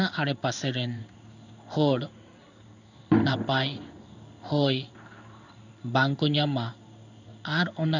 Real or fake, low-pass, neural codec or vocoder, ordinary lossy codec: fake; 7.2 kHz; codec, 16 kHz in and 24 kHz out, 1 kbps, XY-Tokenizer; none